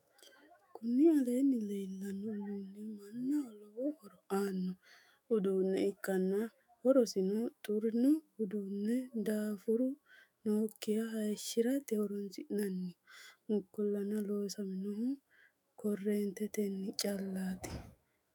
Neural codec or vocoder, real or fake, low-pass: autoencoder, 48 kHz, 128 numbers a frame, DAC-VAE, trained on Japanese speech; fake; 19.8 kHz